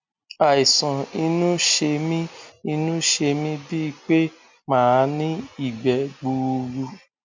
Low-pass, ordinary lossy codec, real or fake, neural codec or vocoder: 7.2 kHz; MP3, 64 kbps; real; none